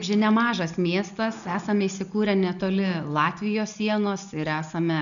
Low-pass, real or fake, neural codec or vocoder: 7.2 kHz; real; none